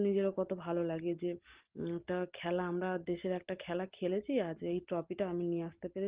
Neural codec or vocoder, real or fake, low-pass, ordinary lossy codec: none; real; 3.6 kHz; Opus, 16 kbps